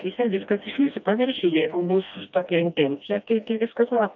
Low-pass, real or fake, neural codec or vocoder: 7.2 kHz; fake; codec, 16 kHz, 1 kbps, FreqCodec, smaller model